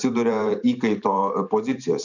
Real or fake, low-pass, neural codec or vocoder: fake; 7.2 kHz; vocoder, 44.1 kHz, 128 mel bands every 512 samples, BigVGAN v2